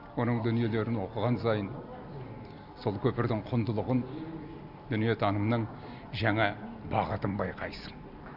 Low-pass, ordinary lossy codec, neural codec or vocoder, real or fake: 5.4 kHz; none; none; real